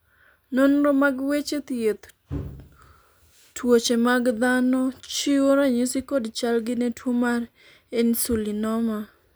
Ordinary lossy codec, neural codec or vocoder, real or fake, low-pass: none; none; real; none